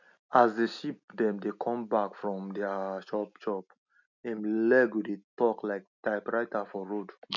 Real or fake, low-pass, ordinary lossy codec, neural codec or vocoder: real; 7.2 kHz; none; none